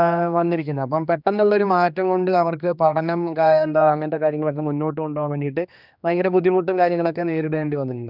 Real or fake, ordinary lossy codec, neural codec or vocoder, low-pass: fake; none; codec, 16 kHz, 2 kbps, X-Codec, HuBERT features, trained on general audio; 5.4 kHz